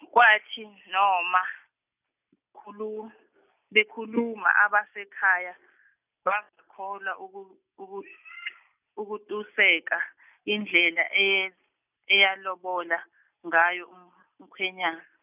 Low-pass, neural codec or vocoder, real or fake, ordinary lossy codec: 3.6 kHz; none; real; none